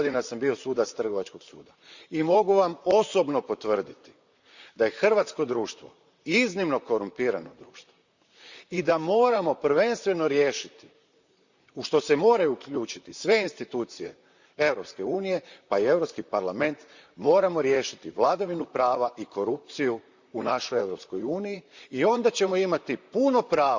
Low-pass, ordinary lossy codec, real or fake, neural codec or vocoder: 7.2 kHz; Opus, 64 kbps; fake; vocoder, 44.1 kHz, 128 mel bands, Pupu-Vocoder